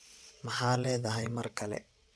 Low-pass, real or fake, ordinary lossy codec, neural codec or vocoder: none; fake; none; vocoder, 22.05 kHz, 80 mel bands, WaveNeXt